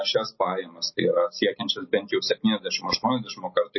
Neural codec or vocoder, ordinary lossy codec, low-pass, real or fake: none; MP3, 24 kbps; 7.2 kHz; real